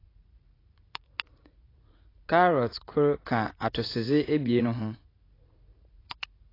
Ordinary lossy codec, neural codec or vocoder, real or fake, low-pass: AAC, 24 kbps; vocoder, 44.1 kHz, 80 mel bands, Vocos; fake; 5.4 kHz